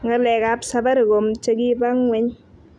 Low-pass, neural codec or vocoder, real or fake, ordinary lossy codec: none; none; real; none